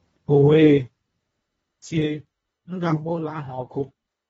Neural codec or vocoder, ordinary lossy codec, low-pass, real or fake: codec, 24 kHz, 1.5 kbps, HILCodec; AAC, 24 kbps; 10.8 kHz; fake